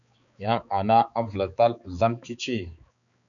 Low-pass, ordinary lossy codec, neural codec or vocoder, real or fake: 7.2 kHz; MP3, 96 kbps; codec, 16 kHz, 4 kbps, X-Codec, HuBERT features, trained on balanced general audio; fake